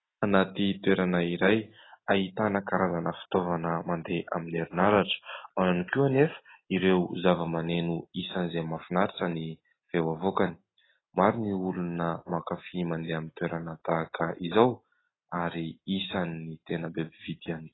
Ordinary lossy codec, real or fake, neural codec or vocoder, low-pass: AAC, 16 kbps; real; none; 7.2 kHz